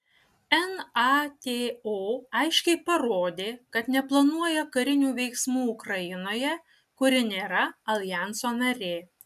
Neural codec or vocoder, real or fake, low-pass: none; real; 14.4 kHz